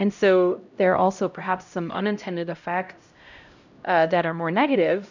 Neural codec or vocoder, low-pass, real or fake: codec, 16 kHz, 0.5 kbps, X-Codec, HuBERT features, trained on LibriSpeech; 7.2 kHz; fake